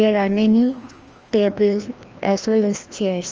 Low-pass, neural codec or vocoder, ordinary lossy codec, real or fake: 7.2 kHz; codec, 16 kHz, 1 kbps, FreqCodec, larger model; Opus, 24 kbps; fake